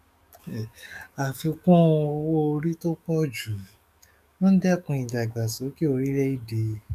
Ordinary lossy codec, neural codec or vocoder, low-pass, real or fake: none; codec, 44.1 kHz, 7.8 kbps, DAC; 14.4 kHz; fake